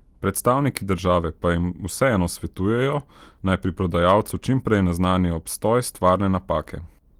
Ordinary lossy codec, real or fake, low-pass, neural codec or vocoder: Opus, 24 kbps; real; 19.8 kHz; none